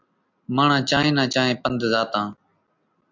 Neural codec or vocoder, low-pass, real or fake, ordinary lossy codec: none; 7.2 kHz; real; MP3, 64 kbps